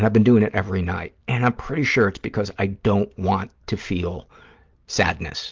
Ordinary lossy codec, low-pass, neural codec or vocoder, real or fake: Opus, 32 kbps; 7.2 kHz; none; real